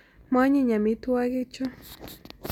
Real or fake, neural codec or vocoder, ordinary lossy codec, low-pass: real; none; none; 19.8 kHz